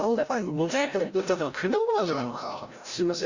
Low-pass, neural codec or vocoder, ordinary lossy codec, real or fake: 7.2 kHz; codec, 16 kHz, 0.5 kbps, FreqCodec, larger model; Opus, 64 kbps; fake